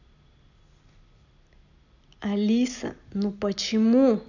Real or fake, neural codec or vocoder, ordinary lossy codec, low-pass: real; none; none; 7.2 kHz